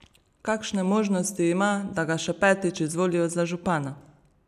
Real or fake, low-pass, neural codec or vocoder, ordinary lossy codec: real; 14.4 kHz; none; none